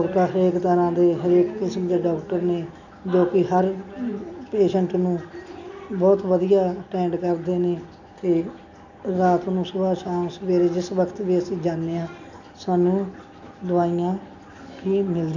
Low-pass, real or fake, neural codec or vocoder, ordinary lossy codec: 7.2 kHz; real; none; none